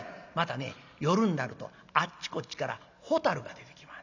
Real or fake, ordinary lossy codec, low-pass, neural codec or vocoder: real; none; 7.2 kHz; none